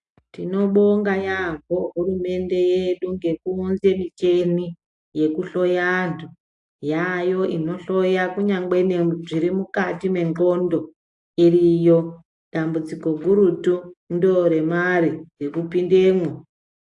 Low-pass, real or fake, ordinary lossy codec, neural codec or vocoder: 10.8 kHz; real; AAC, 64 kbps; none